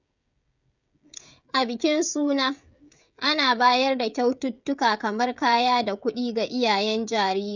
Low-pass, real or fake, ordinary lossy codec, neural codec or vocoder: 7.2 kHz; fake; none; codec, 16 kHz, 16 kbps, FreqCodec, smaller model